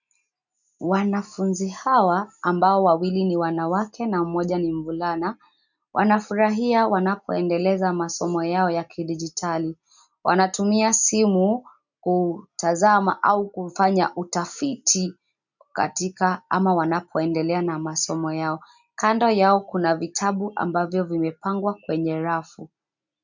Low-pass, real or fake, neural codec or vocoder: 7.2 kHz; real; none